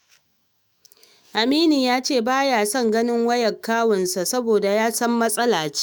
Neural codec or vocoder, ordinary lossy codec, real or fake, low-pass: autoencoder, 48 kHz, 128 numbers a frame, DAC-VAE, trained on Japanese speech; none; fake; none